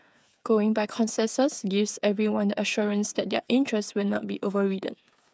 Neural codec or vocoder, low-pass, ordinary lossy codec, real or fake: codec, 16 kHz, 8 kbps, FreqCodec, smaller model; none; none; fake